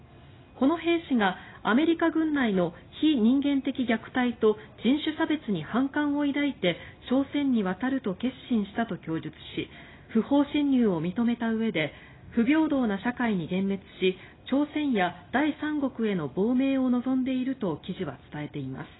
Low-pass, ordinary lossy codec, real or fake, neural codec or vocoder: 7.2 kHz; AAC, 16 kbps; real; none